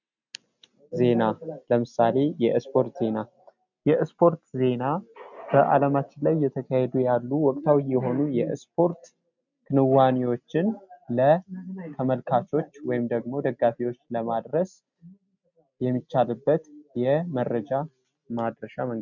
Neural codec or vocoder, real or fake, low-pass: none; real; 7.2 kHz